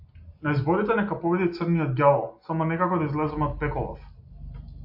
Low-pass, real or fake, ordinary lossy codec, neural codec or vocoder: 5.4 kHz; real; AAC, 48 kbps; none